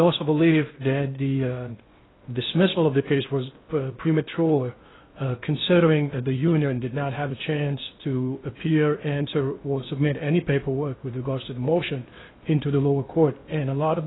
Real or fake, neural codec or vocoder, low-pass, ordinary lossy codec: fake; codec, 16 kHz in and 24 kHz out, 0.6 kbps, FocalCodec, streaming, 2048 codes; 7.2 kHz; AAC, 16 kbps